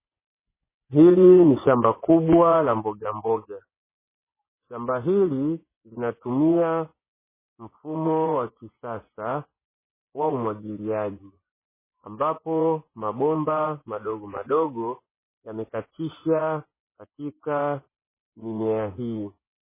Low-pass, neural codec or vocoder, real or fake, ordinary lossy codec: 3.6 kHz; vocoder, 24 kHz, 100 mel bands, Vocos; fake; MP3, 16 kbps